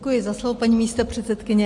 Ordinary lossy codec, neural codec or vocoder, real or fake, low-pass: MP3, 48 kbps; none; real; 10.8 kHz